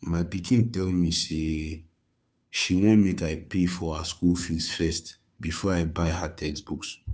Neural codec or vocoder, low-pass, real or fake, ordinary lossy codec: codec, 16 kHz, 2 kbps, FunCodec, trained on Chinese and English, 25 frames a second; none; fake; none